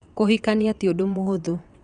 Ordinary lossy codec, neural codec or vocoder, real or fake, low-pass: none; vocoder, 22.05 kHz, 80 mel bands, WaveNeXt; fake; 9.9 kHz